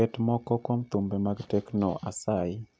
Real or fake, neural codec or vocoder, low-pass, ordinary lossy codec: real; none; none; none